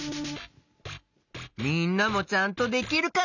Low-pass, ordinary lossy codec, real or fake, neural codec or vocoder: 7.2 kHz; none; real; none